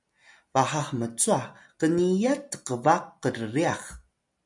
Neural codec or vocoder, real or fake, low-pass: none; real; 10.8 kHz